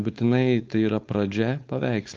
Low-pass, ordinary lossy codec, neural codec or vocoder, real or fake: 7.2 kHz; Opus, 32 kbps; codec, 16 kHz, 4.8 kbps, FACodec; fake